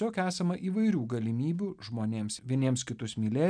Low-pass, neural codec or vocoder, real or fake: 9.9 kHz; none; real